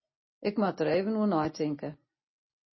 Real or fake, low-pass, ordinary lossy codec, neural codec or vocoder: real; 7.2 kHz; MP3, 24 kbps; none